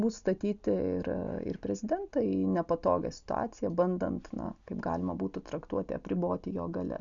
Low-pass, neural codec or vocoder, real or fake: 7.2 kHz; none; real